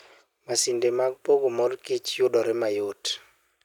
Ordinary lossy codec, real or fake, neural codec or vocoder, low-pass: none; real; none; 19.8 kHz